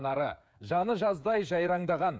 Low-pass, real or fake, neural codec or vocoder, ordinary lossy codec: none; fake; codec, 16 kHz, 8 kbps, FreqCodec, smaller model; none